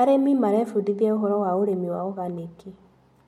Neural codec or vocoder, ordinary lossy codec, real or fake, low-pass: none; MP3, 64 kbps; real; 19.8 kHz